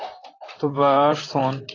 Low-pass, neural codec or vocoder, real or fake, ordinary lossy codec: 7.2 kHz; vocoder, 44.1 kHz, 128 mel bands, Pupu-Vocoder; fake; AAC, 32 kbps